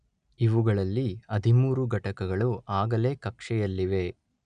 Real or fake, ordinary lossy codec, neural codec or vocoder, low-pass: real; none; none; 9.9 kHz